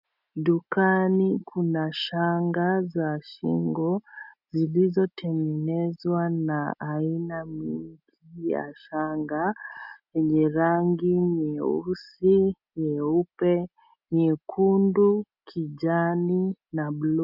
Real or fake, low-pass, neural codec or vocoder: real; 5.4 kHz; none